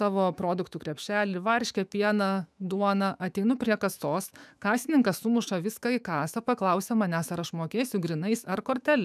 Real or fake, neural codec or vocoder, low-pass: fake; autoencoder, 48 kHz, 128 numbers a frame, DAC-VAE, trained on Japanese speech; 14.4 kHz